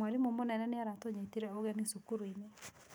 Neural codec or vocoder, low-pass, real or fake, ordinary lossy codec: none; none; real; none